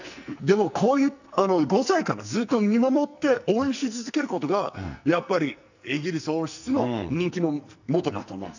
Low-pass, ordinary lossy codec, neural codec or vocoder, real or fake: 7.2 kHz; none; codec, 44.1 kHz, 2.6 kbps, SNAC; fake